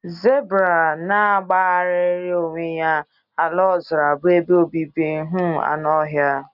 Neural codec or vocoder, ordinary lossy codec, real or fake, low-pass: none; none; real; 5.4 kHz